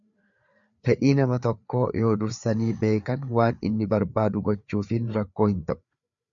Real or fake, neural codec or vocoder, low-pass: fake; codec, 16 kHz, 4 kbps, FreqCodec, larger model; 7.2 kHz